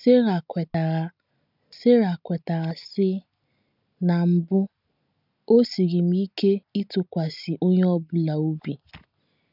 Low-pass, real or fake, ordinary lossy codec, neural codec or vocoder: 5.4 kHz; real; none; none